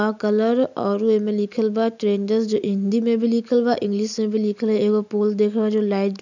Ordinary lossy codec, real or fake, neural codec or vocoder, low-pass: none; real; none; 7.2 kHz